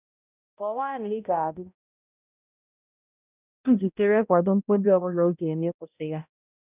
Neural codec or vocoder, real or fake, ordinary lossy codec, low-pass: codec, 16 kHz, 0.5 kbps, X-Codec, HuBERT features, trained on balanced general audio; fake; none; 3.6 kHz